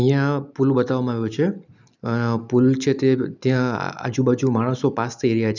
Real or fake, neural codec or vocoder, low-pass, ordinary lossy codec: real; none; 7.2 kHz; none